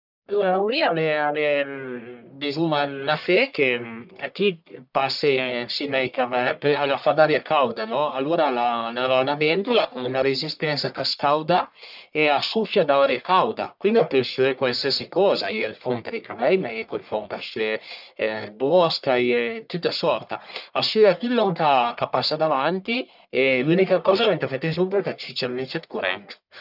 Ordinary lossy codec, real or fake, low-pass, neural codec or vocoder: none; fake; 5.4 kHz; codec, 44.1 kHz, 1.7 kbps, Pupu-Codec